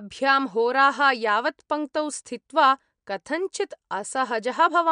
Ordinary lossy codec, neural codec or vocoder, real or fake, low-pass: MP3, 64 kbps; none; real; 9.9 kHz